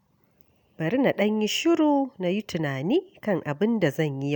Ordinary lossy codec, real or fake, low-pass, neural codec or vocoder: none; real; 19.8 kHz; none